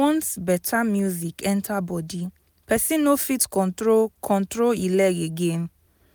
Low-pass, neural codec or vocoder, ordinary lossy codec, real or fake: none; none; none; real